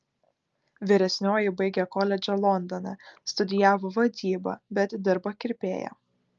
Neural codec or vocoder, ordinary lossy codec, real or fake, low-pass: none; Opus, 24 kbps; real; 7.2 kHz